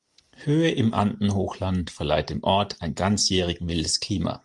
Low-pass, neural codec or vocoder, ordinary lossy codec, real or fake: 10.8 kHz; none; Opus, 32 kbps; real